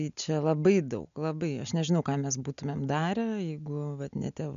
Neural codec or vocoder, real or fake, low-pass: none; real; 7.2 kHz